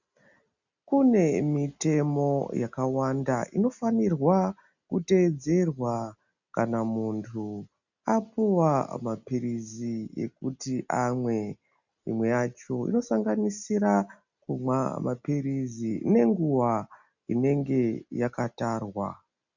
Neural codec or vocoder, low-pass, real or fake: none; 7.2 kHz; real